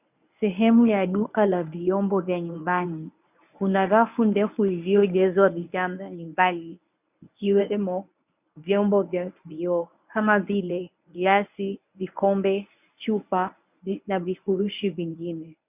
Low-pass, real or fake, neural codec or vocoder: 3.6 kHz; fake; codec, 24 kHz, 0.9 kbps, WavTokenizer, medium speech release version 1